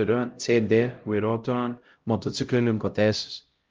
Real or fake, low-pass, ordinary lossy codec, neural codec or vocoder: fake; 7.2 kHz; Opus, 32 kbps; codec, 16 kHz, 0.5 kbps, X-Codec, HuBERT features, trained on LibriSpeech